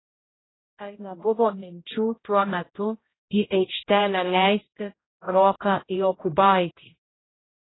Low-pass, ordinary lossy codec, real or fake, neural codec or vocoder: 7.2 kHz; AAC, 16 kbps; fake; codec, 16 kHz, 0.5 kbps, X-Codec, HuBERT features, trained on general audio